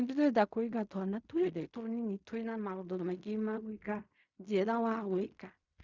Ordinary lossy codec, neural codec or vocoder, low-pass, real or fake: Opus, 64 kbps; codec, 16 kHz in and 24 kHz out, 0.4 kbps, LongCat-Audio-Codec, fine tuned four codebook decoder; 7.2 kHz; fake